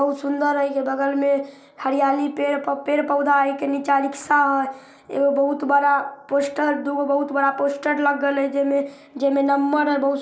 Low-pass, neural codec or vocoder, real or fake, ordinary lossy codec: none; none; real; none